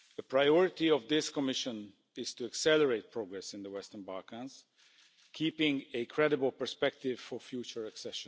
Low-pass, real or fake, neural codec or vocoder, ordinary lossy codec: none; real; none; none